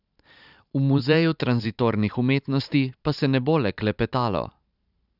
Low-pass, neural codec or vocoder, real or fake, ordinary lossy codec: 5.4 kHz; vocoder, 44.1 kHz, 128 mel bands every 512 samples, BigVGAN v2; fake; none